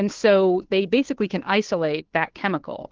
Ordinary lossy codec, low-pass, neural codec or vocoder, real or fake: Opus, 16 kbps; 7.2 kHz; codec, 16 kHz, 2 kbps, FunCodec, trained on Chinese and English, 25 frames a second; fake